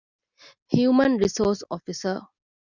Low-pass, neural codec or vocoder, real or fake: 7.2 kHz; none; real